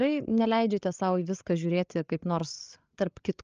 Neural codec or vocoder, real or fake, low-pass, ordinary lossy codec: codec, 16 kHz, 8 kbps, FreqCodec, larger model; fake; 7.2 kHz; Opus, 24 kbps